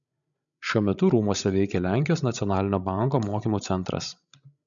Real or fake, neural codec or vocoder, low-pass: fake; codec, 16 kHz, 16 kbps, FreqCodec, larger model; 7.2 kHz